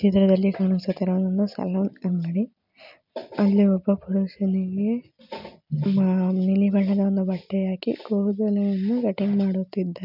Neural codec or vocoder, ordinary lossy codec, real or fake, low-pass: none; none; real; 5.4 kHz